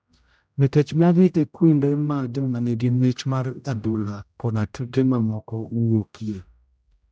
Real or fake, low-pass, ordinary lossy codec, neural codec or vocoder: fake; none; none; codec, 16 kHz, 0.5 kbps, X-Codec, HuBERT features, trained on general audio